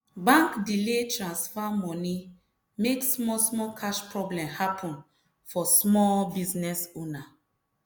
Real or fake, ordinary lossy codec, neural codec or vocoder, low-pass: real; none; none; none